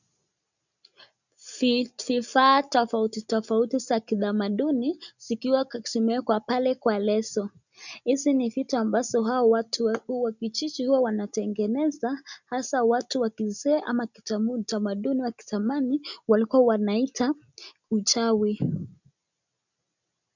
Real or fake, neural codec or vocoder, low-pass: real; none; 7.2 kHz